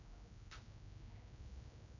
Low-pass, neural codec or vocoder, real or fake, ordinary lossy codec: 7.2 kHz; codec, 16 kHz, 1 kbps, X-Codec, HuBERT features, trained on general audio; fake; none